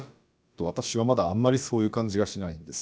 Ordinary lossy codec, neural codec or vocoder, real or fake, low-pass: none; codec, 16 kHz, about 1 kbps, DyCAST, with the encoder's durations; fake; none